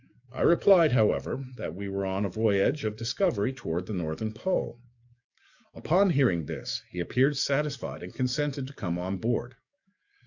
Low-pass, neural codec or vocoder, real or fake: 7.2 kHz; codec, 44.1 kHz, 7.8 kbps, DAC; fake